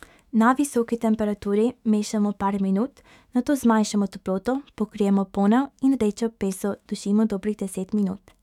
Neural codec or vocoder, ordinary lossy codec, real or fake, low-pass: autoencoder, 48 kHz, 128 numbers a frame, DAC-VAE, trained on Japanese speech; none; fake; 19.8 kHz